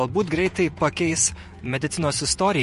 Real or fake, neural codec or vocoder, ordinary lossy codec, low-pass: fake; vocoder, 48 kHz, 128 mel bands, Vocos; MP3, 48 kbps; 14.4 kHz